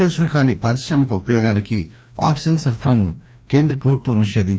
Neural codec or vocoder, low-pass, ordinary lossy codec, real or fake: codec, 16 kHz, 1 kbps, FreqCodec, larger model; none; none; fake